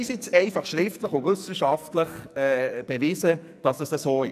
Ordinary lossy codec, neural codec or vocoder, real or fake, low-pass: none; codec, 44.1 kHz, 2.6 kbps, SNAC; fake; 14.4 kHz